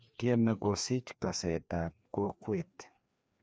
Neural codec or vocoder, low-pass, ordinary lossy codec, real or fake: codec, 16 kHz, 2 kbps, FreqCodec, larger model; none; none; fake